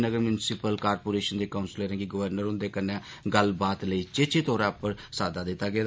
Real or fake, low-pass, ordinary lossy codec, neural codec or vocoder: real; none; none; none